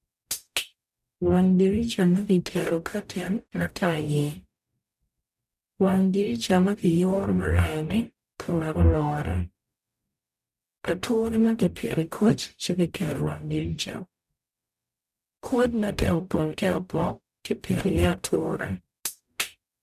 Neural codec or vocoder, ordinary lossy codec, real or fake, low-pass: codec, 44.1 kHz, 0.9 kbps, DAC; none; fake; 14.4 kHz